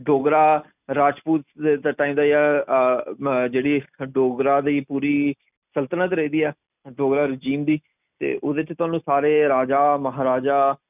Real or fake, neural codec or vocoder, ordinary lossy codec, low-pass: real; none; none; 3.6 kHz